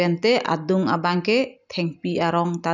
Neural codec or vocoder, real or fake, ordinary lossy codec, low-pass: none; real; none; 7.2 kHz